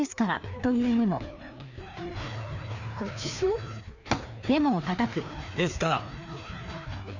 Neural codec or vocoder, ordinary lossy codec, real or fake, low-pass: codec, 16 kHz, 2 kbps, FreqCodec, larger model; none; fake; 7.2 kHz